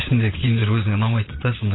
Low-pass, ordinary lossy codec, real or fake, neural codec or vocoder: 7.2 kHz; AAC, 16 kbps; fake; vocoder, 22.05 kHz, 80 mel bands, Vocos